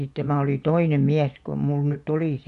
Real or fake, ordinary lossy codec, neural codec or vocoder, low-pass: fake; none; vocoder, 24 kHz, 100 mel bands, Vocos; 10.8 kHz